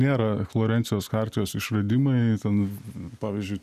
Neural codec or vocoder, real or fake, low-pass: none; real; 14.4 kHz